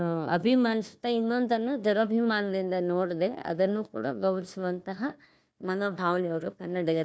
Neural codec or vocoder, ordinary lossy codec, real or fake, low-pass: codec, 16 kHz, 1 kbps, FunCodec, trained on Chinese and English, 50 frames a second; none; fake; none